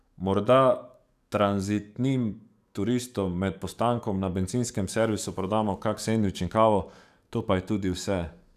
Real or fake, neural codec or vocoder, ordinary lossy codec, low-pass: fake; codec, 44.1 kHz, 7.8 kbps, DAC; none; 14.4 kHz